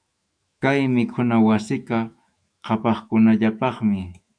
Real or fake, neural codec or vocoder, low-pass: fake; autoencoder, 48 kHz, 128 numbers a frame, DAC-VAE, trained on Japanese speech; 9.9 kHz